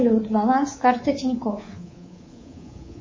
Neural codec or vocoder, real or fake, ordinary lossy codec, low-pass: codec, 24 kHz, 3.1 kbps, DualCodec; fake; MP3, 32 kbps; 7.2 kHz